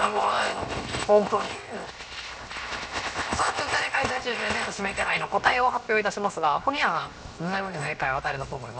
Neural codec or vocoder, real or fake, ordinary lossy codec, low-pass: codec, 16 kHz, 0.7 kbps, FocalCodec; fake; none; none